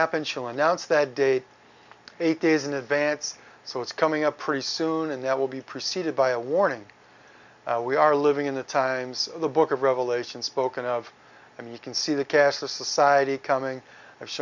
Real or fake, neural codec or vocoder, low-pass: real; none; 7.2 kHz